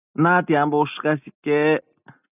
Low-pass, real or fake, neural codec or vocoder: 3.6 kHz; real; none